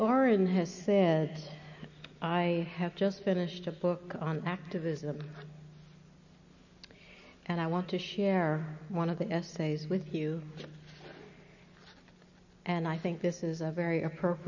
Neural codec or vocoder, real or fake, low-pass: none; real; 7.2 kHz